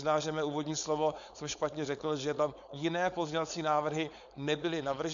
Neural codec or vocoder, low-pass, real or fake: codec, 16 kHz, 4.8 kbps, FACodec; 7.2 kHz; fake